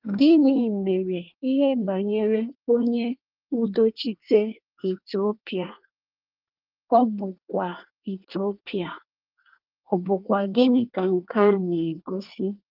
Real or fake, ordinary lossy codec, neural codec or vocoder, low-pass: fake; Opus, 24 kbps; codec, 24 kHz, 1 kbps, SNAC; 5.4 kHz